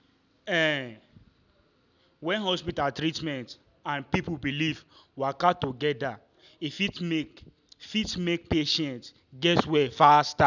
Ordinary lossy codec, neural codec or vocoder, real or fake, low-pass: none; none; real; 7.2 kHz